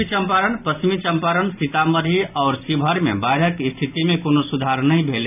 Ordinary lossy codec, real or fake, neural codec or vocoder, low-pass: none; real; none; 3.6 kHz